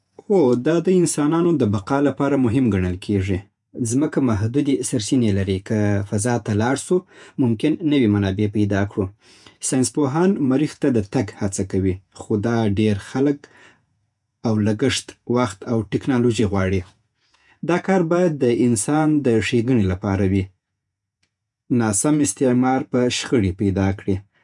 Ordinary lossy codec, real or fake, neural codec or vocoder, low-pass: none; fake; vocoder, 48 kHz, 128 mel bands, Vocos; 10.8 kHz